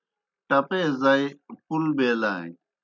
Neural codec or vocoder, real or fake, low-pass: none; real; 7.2 kHz